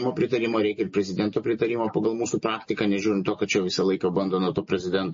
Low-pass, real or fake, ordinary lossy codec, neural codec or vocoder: 7.2 kHz; real; MP3, 32 kbps; none